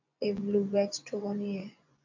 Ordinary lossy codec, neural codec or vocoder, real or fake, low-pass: AAC, 48 kbps; vocoder, 24 kHz, 100 mel bands, Vocos; fake; 7.2 kHz